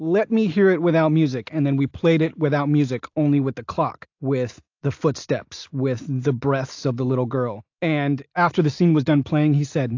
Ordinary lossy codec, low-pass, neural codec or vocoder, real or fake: AAC, 48 kbps; 7.2 kHz; none; real